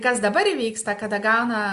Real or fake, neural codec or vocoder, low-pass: real; none; 10.8 kHz